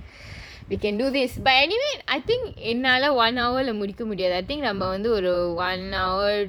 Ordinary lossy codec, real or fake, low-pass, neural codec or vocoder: none; fake; 19.8 kHz; vocoder, 44.1 kHz, 128 mel bands, Pupu-Vocoder